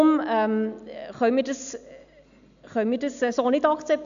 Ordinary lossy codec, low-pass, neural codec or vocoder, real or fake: AAC, 96 kbps; 7.2 kHz; none; real